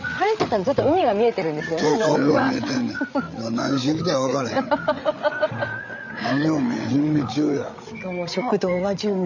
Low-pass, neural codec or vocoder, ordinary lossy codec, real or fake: 7.2 kHz; codec, 16 kHz, 8 kbps, FreqCodec, larger model; none; fake